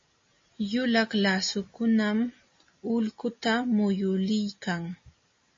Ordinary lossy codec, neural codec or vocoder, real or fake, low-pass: MP3, 32 kbps; none; real; 7.2 kHz